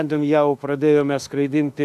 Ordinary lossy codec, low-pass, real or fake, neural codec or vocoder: AAC, 96 kbps; 14.4 kHz; fake; autoencoder, 48 kHz, 32 numbers a frame, DAC-VAE, trained on Japanese speech